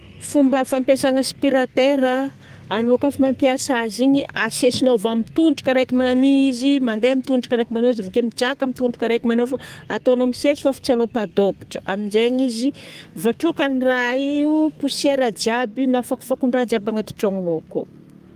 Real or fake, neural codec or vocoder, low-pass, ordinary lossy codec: fake; codec, 32 kHz, 1.9 kbps, SNAC; 14.4 kHz; Opus, 32 kbps